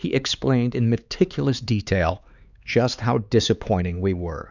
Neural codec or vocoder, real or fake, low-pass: codec, 16 kHz, 4 kbps, X-Codec, HuBERT features, trained on LibriSpeech; fake; 7.2 kHz